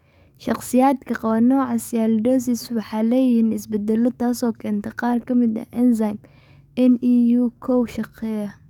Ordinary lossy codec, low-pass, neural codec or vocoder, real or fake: none; 19.8 kHz; codec, 44.1 kHz, 7.8 kbps, DAC; fake